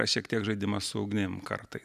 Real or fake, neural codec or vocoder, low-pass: real; none; 14.4 kHz